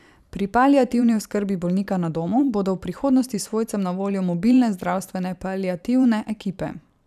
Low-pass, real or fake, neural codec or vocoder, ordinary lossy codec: 14.4 kHz; fake; vocoder, 44.1 kHz, 128 mel bands every 512 samples, BigVGAN v2; none